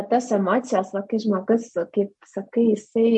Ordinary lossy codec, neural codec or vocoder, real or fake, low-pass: MP3, 48 kbps; none; real; 10.8 kHz